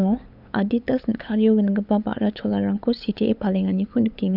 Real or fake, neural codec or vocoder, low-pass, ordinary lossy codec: fake; codec, 16 kHz, 8 kbps, FunCodec, trained on LibriTTS, 25 frames a second; 5.4 kHz; AAC, 48 kbps